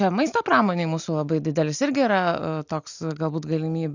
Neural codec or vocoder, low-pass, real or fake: none; 7.2 kHz; real